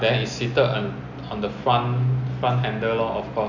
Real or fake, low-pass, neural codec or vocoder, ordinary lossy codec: real; 7.2 kHz; none; none